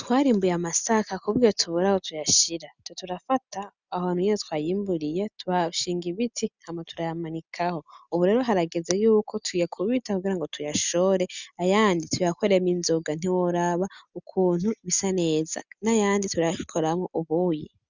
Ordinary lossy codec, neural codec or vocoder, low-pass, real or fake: Opus, 64 kbps; none; 7.2 kHz; real